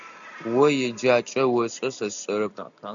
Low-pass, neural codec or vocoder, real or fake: 7.2 kHz; none; real